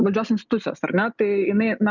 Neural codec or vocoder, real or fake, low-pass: none; real; 7.2 kHz